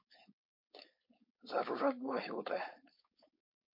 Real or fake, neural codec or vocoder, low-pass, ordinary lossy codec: fake; codec, 16 kHz, 4.8 kbps, FACodec; 5.4 kHz; MP3, 32 kbps